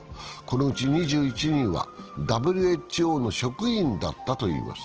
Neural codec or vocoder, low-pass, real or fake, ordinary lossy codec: none; 7.2 kHz; real; Opus, 16 kbps